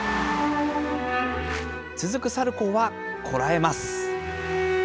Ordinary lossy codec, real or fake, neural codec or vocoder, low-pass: none; real; none; none